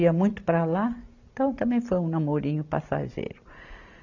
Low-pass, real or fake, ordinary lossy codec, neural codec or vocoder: 7.2 kHz; real; none; none